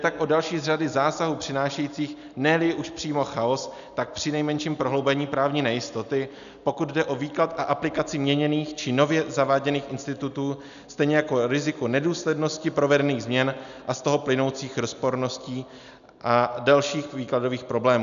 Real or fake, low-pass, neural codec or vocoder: real; 7.2 kHz; none